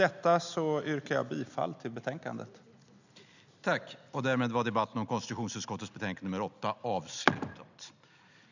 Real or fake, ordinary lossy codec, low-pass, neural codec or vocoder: real; none; 7.2 kHz; none